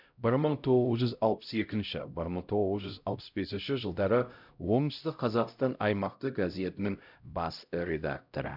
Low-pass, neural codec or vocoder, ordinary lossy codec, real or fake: 5.4 kHz; codec, 16 kHz, 0.5 kbps, X-Codec, HuBERT features, trained on LibriSpeech; none; fake